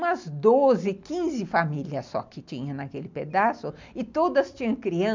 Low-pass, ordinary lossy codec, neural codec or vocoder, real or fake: 7.2 kHz; MP3, 64 kbps; none; real